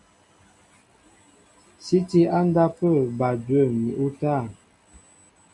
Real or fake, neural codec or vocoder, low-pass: real; none; 10.8 kHz